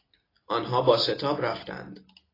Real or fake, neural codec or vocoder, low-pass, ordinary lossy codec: real; none; 5.4 kHz; AAC, 24 kbps